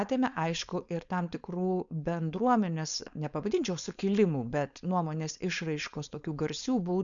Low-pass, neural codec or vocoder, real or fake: 7.2 kHz; codec, 16 kHz, 4.8 kbps, FACodec; fake